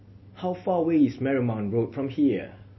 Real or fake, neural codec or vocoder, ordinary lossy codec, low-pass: real; none; MP3, 24 kbps; 7.2 kHz